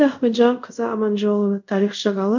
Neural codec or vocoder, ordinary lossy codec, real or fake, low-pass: codec, 24 kHz, 0.5 kbps, DualCodec; none; fake; 7.2 kHz